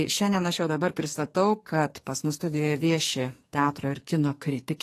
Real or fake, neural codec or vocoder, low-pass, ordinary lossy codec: fake; codec, 44.1 kHz, 2.6 kbps, SNAC; 14.4 kHz; AAC, 48 kbps